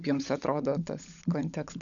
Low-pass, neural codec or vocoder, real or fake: 7.2 kHz; codec, 16 kHz, 16 kbps, FunCodec, trained on LibriTTS, 50 frames a second; fake